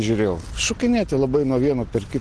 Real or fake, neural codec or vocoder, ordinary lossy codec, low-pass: real; none; Opus, 16 kbps; 10.8 kHz